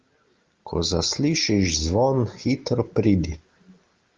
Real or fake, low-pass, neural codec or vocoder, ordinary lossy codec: real; 7.2 kHz; none; Opus, 32 kbps